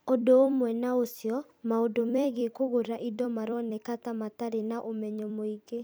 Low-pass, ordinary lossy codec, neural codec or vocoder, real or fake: none; none; vocoder, 44.1 kHz, 128 mel bands every 256 samples, BigVGAN v2; fake